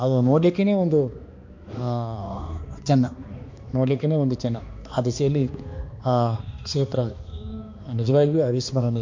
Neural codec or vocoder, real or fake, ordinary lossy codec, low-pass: codec, 16 kHz, 2 kbps, X-Codec, HuBERT features, trained on balanced general audio; fake; MP3, 48 kbps; 7.2 kHz